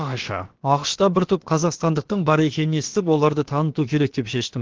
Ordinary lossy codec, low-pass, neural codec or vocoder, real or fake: Opus, 16 kbps; 7.2 kHz; codec, 16 kHz, about 1 kbps, DyCAST, with the encoder's durations; fake